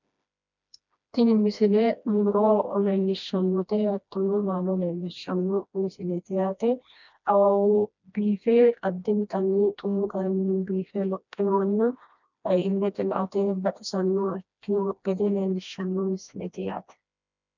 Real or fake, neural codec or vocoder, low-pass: fake; codec, 16 kHz, 1 kbps, FreqCodec, smaller model; 7.2 kHz